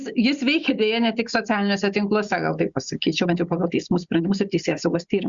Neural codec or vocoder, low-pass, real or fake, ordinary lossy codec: none; 7.2 kHz; real; Opus, 64 kbps